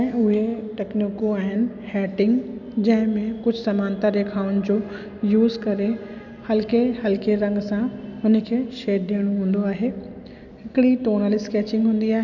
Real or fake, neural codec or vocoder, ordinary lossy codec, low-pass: real; none; none; 7.2 kHz